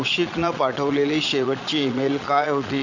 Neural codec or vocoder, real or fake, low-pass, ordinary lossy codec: vocoder, 22.05 kHz, 80 mel bands, Vocos; fake; 7.2 kHz; none